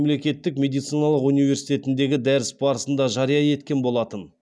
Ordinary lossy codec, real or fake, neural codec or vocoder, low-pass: none; real; none; none